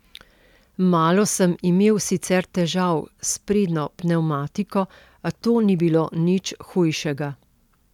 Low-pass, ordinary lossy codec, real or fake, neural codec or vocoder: 19.8 kHz; none; real; none